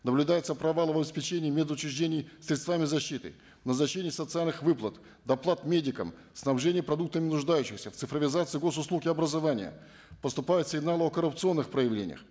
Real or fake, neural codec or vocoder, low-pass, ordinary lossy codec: real; none; none; none